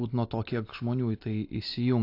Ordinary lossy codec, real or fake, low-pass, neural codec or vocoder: AAC, 32 kbps; real; 5.4 kHz; none